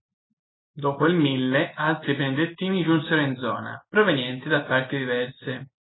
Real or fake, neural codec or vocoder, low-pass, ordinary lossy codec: real; none; 7.2 kHz; AAC, 16 kbps